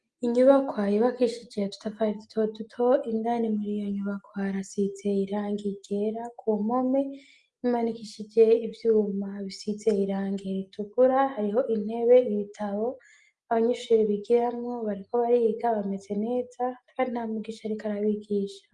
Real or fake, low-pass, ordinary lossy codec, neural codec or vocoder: real; 10.8 kHz; Opus, 32 kbps; none